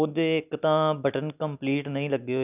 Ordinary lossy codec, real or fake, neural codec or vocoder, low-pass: none; real; none; 3.6 kHz